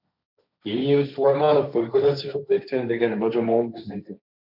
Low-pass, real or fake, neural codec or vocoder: 5.4 kHz; fake; codec, 16 kHz, 1.1 kbps, Voila-Tokenizer